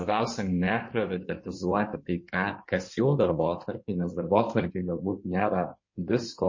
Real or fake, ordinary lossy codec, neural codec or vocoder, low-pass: fake; MP3, 32 kbps; codec, 16 kHz in and 24 kHz out, 2.2 kbps, FireRedTTS-2 codec; 7.2 kHz